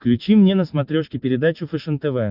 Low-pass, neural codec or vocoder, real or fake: 5.4 kHz; none; real